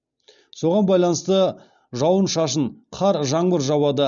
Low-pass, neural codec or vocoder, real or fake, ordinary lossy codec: 7.2 kHz; none; real; none